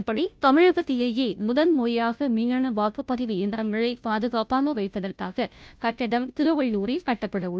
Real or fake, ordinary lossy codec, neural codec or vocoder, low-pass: fake; none; codec, 16 kHz, 0.5 kbps, FunCodec, trained on Chinese and English, 25 frames a second; none